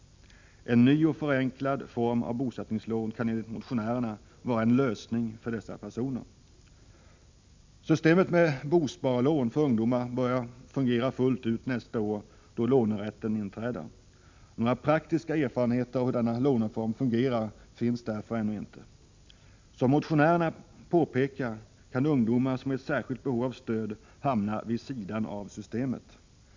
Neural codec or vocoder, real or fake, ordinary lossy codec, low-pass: none; real; MP3, 64 kbps; 7.2 kHz